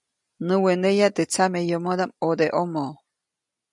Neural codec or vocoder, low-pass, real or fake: none; 10.8 kHz; real